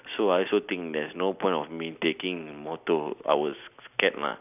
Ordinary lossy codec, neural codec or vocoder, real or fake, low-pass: none; none; real; 3.6 kHz